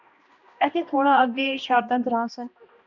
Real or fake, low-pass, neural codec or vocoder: fake; 7.2 kHz; codec, 16 kHz, 1 kbps, X-Codec, HuBERT features, trained on balanced general audio